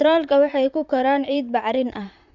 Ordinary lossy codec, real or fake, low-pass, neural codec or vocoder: none; fake; 7.2 kHz; vocoder, 44.1 kHz, 128 mel bands, Pupu-Vocoder